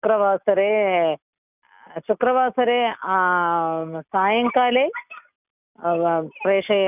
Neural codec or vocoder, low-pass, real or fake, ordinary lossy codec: none; 3.6 kHz; real; none